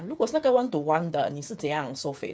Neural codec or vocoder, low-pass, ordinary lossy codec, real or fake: codec, 16 kHz, 8 kbps, FreqCodec, smaller model; none; none; fake